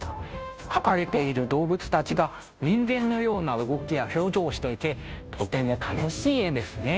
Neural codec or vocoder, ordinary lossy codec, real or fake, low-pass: codec, 16 kHz, 0.5 kbps, FunCodec, trained on Chinese and English, 25 frames a second; none; fake; none